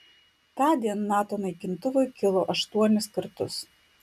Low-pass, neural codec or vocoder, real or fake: 14.4 kHz; none; real